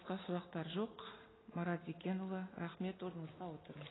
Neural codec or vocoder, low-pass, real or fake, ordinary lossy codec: none; 7.2 kHz; real; AAC, 16 kbps